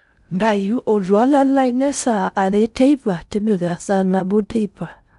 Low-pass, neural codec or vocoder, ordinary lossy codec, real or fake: 10.8 kHz; codec, 16 kHz in and 24 kHz out, 0.6 kbps, FocalCodec, streaming, 4096 codes; none; fake